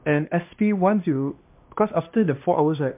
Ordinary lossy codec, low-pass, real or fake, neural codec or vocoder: MP3, 32 kbps; 3.6 kHz; fake; codec, 16 kHz, 1 kbps, X-Codec, HuBERT features, trained on LibriSpeech